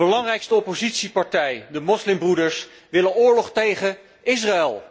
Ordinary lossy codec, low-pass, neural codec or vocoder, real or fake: none; none; none; real